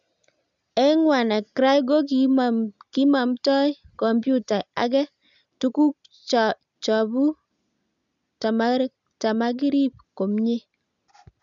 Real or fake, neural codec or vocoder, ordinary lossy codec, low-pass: real; none; none; 7.2 kHz